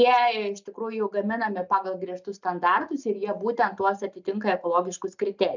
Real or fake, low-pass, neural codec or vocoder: real; 7.2 kHz; none